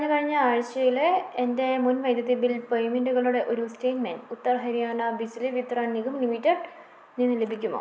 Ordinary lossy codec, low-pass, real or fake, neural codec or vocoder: none; none; real; none